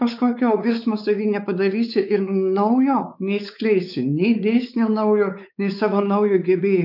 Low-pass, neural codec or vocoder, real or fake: 5.4 kHz; codec, 16 kHz, 4 kbps, X-Codec, WavLM features, trained on Multilingual LibriSpeech; fake